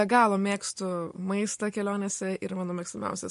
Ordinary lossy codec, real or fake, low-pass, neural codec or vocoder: MP3, 48 kbps; real; 14.4 kHz; none